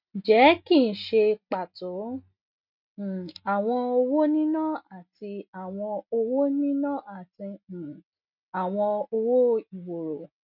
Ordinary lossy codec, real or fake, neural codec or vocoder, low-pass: none; real; none; 5.4 kHz